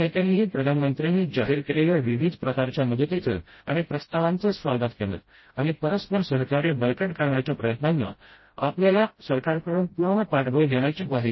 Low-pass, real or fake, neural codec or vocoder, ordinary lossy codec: 7.2 kHz; fake; codec, 16 kHz, 0.5 kbps, FreqCodec, smaller model; MP3, 24 kbps